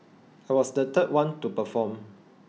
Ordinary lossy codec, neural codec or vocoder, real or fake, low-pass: none; none; real; none